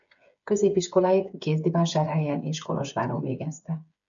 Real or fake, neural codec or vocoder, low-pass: fake; codec, 16 kHz, 8 kbps, FreqCodec, smaller model; 7.2 kHz